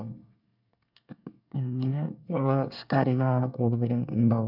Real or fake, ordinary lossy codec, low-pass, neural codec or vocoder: fake; none; 5.4 kHz; codec, 24 kHz, 1 kbps, SNAC